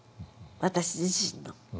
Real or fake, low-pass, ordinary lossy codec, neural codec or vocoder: real; none; none; none